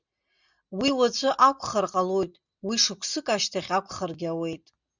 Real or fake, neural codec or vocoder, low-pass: real; none; 7.2 kHz